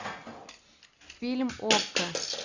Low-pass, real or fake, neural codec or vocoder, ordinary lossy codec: 7.2 kHz; real; none; AAC, 48 kbps